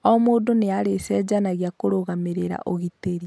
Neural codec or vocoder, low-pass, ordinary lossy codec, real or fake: none; none; none; real